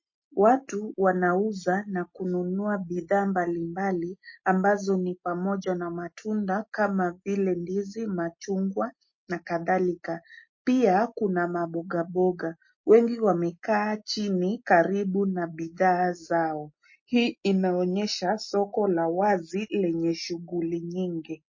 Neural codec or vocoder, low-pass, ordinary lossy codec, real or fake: none; 7.2 kHz; MP3, 32 kbps; real